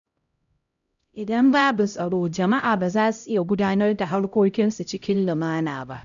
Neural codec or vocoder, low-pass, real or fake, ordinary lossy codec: codec, 16 kHz, 0.5 kbps, X-Codec, HuBERT features, trained on LibriSpeech; 7.2 kHz; fake; none